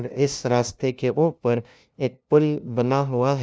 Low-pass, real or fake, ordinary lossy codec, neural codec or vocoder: none; fake; none; codec, 16 kHz, 0.5 kbps, FunCodec, trained on LibriTTS, 25 frames a second